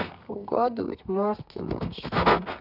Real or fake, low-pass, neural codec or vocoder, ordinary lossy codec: fake; 5.4 kHz; codec, 32 kHz, 1.9 kbps, SNAC; none